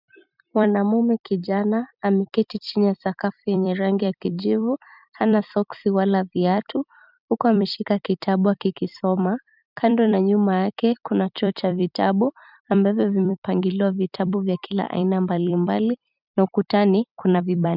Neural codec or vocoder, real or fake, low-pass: vocoder, 44.1 kHz, 128 mel bands every 256 samples, BigVGAN v2; fake; 5.4 kHz